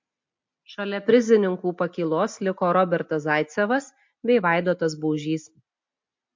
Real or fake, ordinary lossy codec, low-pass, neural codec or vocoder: real; MP3, 48 kbps; 7.2 kHz; none